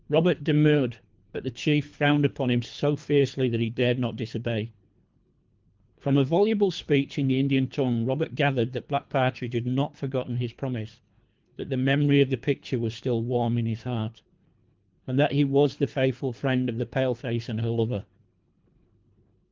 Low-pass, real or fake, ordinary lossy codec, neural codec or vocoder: 7.2 kHz; fake; Opus, 32 kbps; codec, 24 kHz, 3 kbps, HILCodec